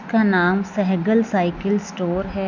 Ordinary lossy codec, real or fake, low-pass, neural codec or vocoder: none; real; 7.2 kHz; none